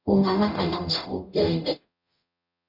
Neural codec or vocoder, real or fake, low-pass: codec, 44.1 kHz, 0.9 kbps, DAC; fake; 5.4 kHz